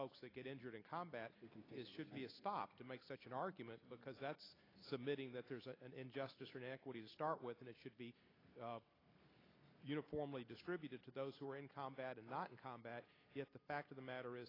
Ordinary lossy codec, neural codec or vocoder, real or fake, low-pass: AAC, 24 kbps; none; real; 5.4 kHz